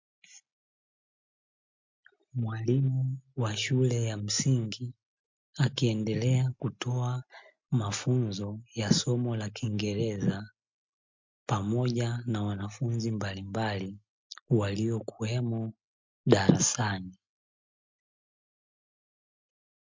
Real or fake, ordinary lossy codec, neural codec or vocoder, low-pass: real; MP3, 48 kbps; none; 7.2 kHz